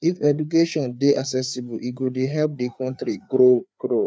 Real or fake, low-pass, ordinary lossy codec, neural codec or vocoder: fake; none; none; codec, 16 kHz, 4 kbps, FreqCodec, larger model